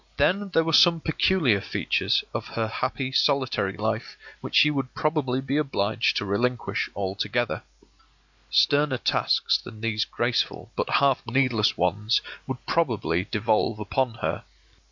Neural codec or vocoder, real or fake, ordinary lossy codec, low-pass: none; real; MP3, 64 kbps; 7.2 kHz